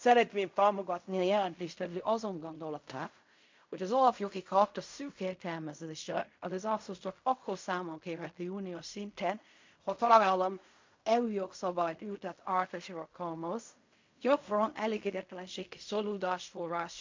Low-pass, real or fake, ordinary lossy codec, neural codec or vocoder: 7.2 kHz; fake; MP3, 48 kbps; codec, 16 kHz in and 24 kHz out, 0.4 kbps, LongCat-Audio-Codec, fine tuned four codebook decoder